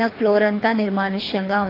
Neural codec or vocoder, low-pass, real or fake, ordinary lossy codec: codec, 24 kHz, 3 kbps, HILCodec; 5.4 kHz; fake; AAC, 24 kbps